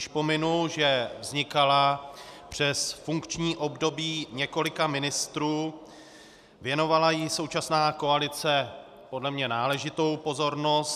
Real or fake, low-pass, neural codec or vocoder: real; 14.4 kHz; none